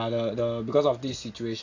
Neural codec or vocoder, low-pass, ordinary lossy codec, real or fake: none; 7.2 kHz; AAC, 48 kbps; real